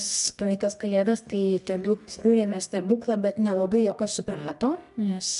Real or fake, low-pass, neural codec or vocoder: fake; 10.8 kHz; codec, 24 kHz, 0.9 kbps, WavTokenizer, medium music audio release